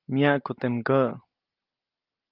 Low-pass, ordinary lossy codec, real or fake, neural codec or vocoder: 5.4 kHz; Opus, 32 kbps; real; none